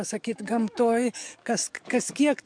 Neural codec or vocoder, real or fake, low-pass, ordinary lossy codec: codec, 44.1 kHz, 7.8 kbps, DAC; fake; 9.9 kHz; MP3, 64 kbps